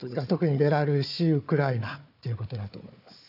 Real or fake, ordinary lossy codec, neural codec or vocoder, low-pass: fake; none; codec, 16 kHz, 4 kbps, FunCodec, trained on Chinese and English, 50 frames a second; 5.4 kHz